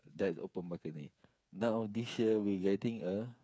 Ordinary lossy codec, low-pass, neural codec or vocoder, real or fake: none; none; codec, 16 kHz, 8 kbps, FreqCodec, smaller model; fake